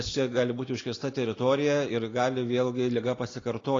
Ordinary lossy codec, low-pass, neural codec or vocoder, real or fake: AAC, 32 kbps; 7.2 kHz; none; real